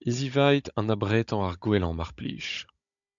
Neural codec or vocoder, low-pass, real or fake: codec, 16 kHz, 16 kbps, FunCodec, trained on Chinese and English, 50 frames a second; 7.2 kHz; fake